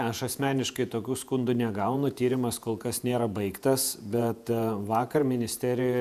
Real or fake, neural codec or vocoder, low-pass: fake; vocoder, 48 kHz, 128 mel bands, Vocos; 14.4 kHz